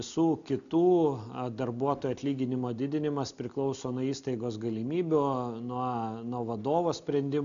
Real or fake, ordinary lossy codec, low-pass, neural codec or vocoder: real; MP3, 96 kbps; 7.2 kHz; none